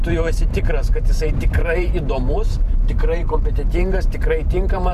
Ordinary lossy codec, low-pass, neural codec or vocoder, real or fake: Opus, 64 kbps; 14.4 kHz; vocoder, 44.1 kHz, 128 mel bands every 512 samples, BigVGAN v2; fake